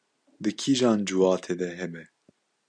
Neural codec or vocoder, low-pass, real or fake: none; 9.9 kHz; real